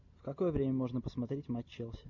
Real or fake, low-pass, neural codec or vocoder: real; 7.2 kHz; none